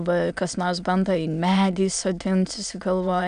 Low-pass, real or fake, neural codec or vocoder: 9.9 kHz; fake; autoencoder, 22.05 kHz, a latent of 192 numbers a frame, VITS, trained on many speakers